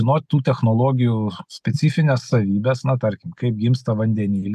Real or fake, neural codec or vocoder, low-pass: real; none; 14.4 kHz